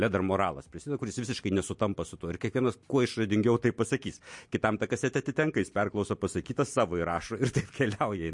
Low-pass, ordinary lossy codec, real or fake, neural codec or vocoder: 10.8 kHz; MP3, 48 kbps; real; none